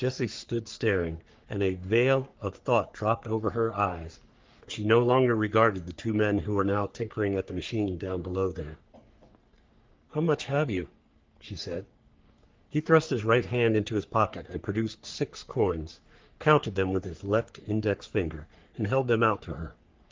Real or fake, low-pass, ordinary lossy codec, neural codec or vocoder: fake; 7.2 kHz; Opus, 32 kbps; codec, 44.1 kHz, 3.4 kbps, Pupu-Codec